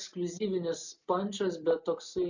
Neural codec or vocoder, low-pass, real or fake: none; 7.2 kHz; real